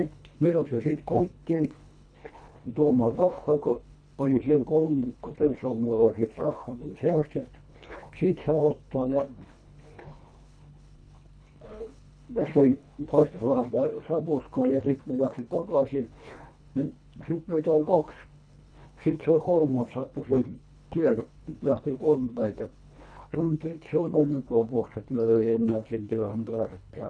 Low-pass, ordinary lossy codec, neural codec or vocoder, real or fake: 9.9 kHz; none; codec, 24 kHz, 1.5 kbps, HILCodec; fake